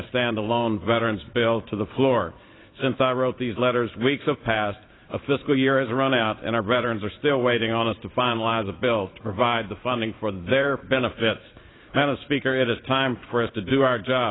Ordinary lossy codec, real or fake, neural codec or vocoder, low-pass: AAC, 16 kbps; fake; vocoder, 44.1 kHz, 128 mel bands every 512 samples, BigVGAN v2; 7.2 kHz